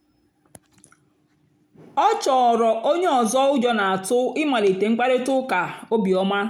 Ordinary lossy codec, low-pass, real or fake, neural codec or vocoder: none; 19.8 kHz; real; none